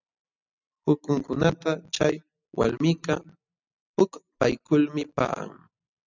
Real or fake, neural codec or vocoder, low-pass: real; none; 7.2 kHz